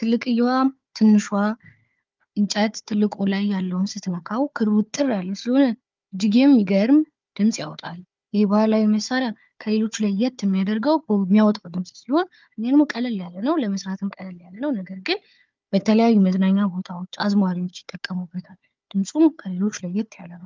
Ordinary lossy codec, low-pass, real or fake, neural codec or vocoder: Opus, 24 kbps; 7.2 kHz; fake; codec, 16 kHz, 4 kbps, FunCodec, trained on Chinese and English, 50 frames a second